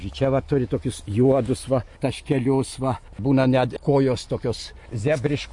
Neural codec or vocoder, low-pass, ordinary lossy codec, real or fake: none; 10.8 kHz; MP3, 48 kbps; real